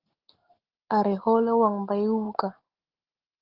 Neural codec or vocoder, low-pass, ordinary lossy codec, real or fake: none; 5.4 kHz; Opus, 16 kbps; real